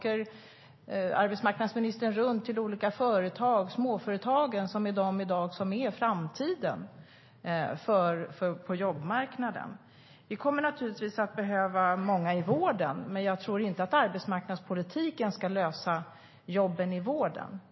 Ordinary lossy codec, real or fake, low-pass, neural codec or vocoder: MP3, 24 kbps; real; 7.2 kHz; none